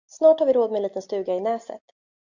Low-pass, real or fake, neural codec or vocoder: 7.2 kHz; real; none